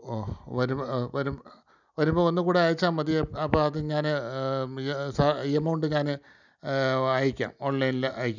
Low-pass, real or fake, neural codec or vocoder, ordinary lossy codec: 7.2 kHz; real; none; none